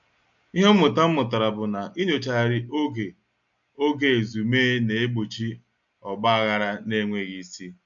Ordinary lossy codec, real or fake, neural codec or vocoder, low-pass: none; real; none; 7.2 kHz